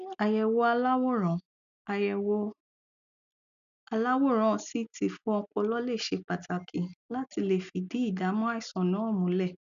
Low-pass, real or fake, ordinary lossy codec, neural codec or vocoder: 7.2 kHz; real; none; none